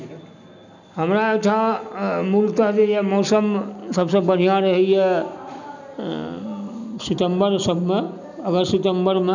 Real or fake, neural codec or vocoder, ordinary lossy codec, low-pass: real; none; none; 7.2 kHz